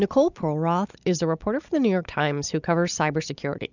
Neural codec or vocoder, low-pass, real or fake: none; 7.2 kHz; real